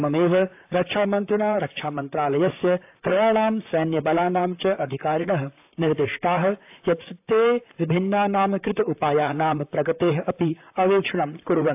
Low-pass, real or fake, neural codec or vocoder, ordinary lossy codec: 3.6 kHz; fake; vocoder, 44.1 kHz, 128 mel bands, Pupu-Vocoder; AAC, 32 kbps